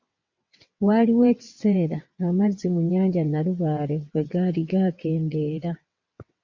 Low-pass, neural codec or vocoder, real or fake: 7.2 kHz; vocoder, 22.05 kHz, 80 mel bands, WaveNeXt; fake